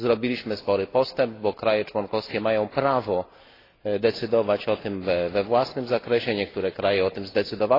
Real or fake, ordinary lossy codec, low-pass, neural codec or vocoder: real; AAC, 24 kbps; 5.4 kHz; none